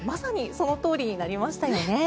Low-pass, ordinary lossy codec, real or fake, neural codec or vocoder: none; none; real; none